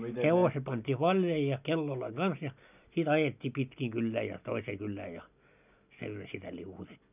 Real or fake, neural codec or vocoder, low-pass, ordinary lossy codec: real; none; 3.6 kHz; none